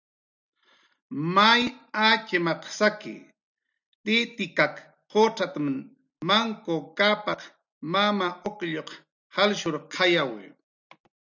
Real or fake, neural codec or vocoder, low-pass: real; none; 7.2 kHz